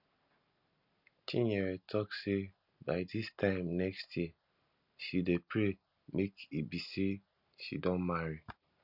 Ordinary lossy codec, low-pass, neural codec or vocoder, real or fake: none; 5.4 kHz; none; real